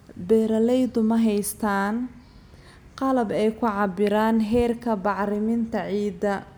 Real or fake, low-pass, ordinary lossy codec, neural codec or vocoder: real; none; none; none